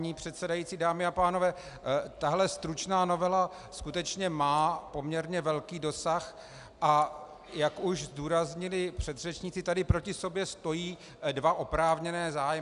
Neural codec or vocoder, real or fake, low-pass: none; real; 10.8 kHz